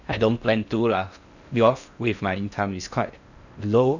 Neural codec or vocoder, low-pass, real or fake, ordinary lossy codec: codec, 16 kHz in and 24 kHz out, 0.6 kbps, FocalCodec, streaming, 4096 codes; 7.2 kHz; fake; none